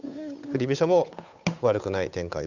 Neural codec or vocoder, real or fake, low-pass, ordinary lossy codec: codec, 16 kHz, 8 kbps, FunCodec, trained on Chinese and English, 25 frames a second; fake; 7.2 kHz; none